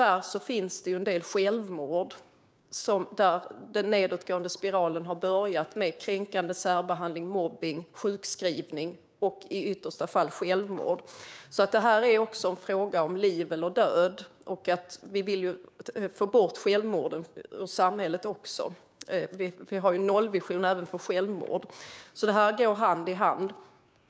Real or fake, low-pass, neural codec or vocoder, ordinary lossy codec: fake; none; codec, 16 kHz, 6 kbps, DAC; none